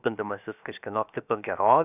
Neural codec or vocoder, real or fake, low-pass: codec, 16 kHz, 0.7 kbps, FocalCodec; fake; 3.6 kHz